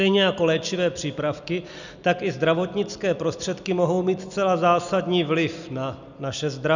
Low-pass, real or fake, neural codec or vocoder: 7.2 kHz; real; none